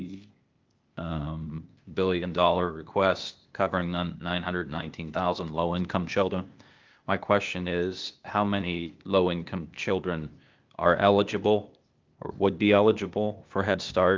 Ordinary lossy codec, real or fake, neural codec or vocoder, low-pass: Opus, 24 kbps; fake; codec, 16 kHz, 0.8 kbps, ZipCodec; 7.2 kHz